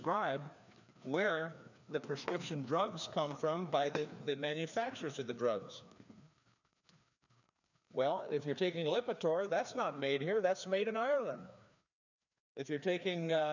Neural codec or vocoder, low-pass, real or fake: codec, 16 kHz, 2 kbps, FreqCodec, larger model; 7.2 kHz; fake